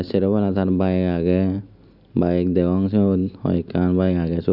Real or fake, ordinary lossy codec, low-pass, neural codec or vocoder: real; none; 5.4 kHz; none